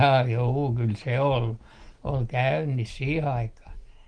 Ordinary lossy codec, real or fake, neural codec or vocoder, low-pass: Opus, 24 kbps; real; none; 9.9 kHz